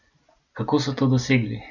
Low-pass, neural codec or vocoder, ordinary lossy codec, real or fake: 7.2 kHz; none; none; real